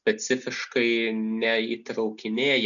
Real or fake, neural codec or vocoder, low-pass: real; none; 7.2 kHz